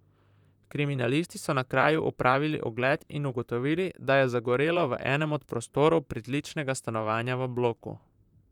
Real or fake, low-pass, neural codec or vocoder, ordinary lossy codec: fake; 19.8 kHz; vocoder, 44.1 kHz, 128 mel bands, Pupu-Vocoder; none